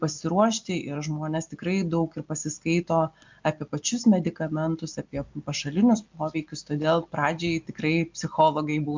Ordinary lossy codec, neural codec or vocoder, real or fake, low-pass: MP3, 64 kbps; none; real; 7.2 kHz